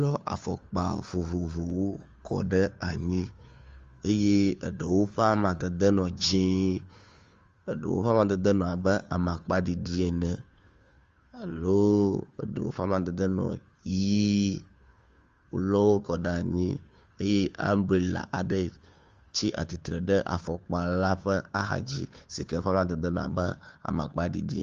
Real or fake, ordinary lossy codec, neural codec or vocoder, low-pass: fake; Opus, 32 kbps; codec, 16 kHz, 2 kbps, FunCodec, trained on Chinese and English, 25 frames a second; 7.2 kHz